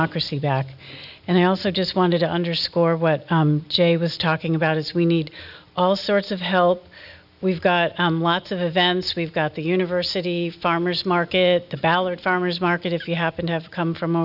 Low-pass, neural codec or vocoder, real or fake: 5.4 kHz; none; real